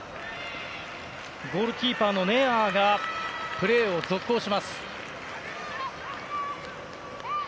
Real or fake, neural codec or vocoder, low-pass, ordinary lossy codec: real; none; none; none